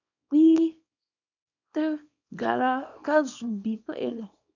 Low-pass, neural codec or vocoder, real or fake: 7.2 kHz; codec, 24 kHz, 0.9 kbps, WavTokenizer, small release; fake